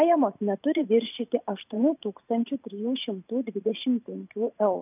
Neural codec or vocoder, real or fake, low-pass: vocoder, 44.1 kHz, 128 mel bands every 512 samples, BigVGAN v2; fake; 3.6 kHz